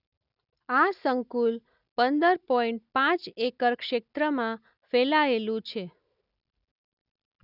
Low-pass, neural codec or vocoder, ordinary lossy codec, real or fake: 5.4 kHz; none; none; real